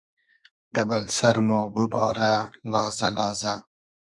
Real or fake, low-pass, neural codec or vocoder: fake; 10.8 kHz; codec, 24 kHz, 1 kbps, SNAC